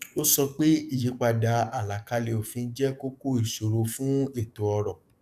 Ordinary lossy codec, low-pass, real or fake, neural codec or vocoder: none; 14.4 kHz; fake; codec, 44.1 kHz, 7.8 kbps, DAC